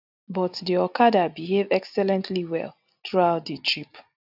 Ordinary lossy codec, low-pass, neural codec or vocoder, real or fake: none; 5.4 kHz; none; real